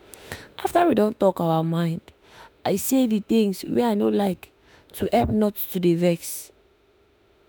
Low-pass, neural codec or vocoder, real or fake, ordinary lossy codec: none; autoencoder, 48 kHz, 32 numbers a frame, DAC-VAE, trained on Japanese speech; fake; none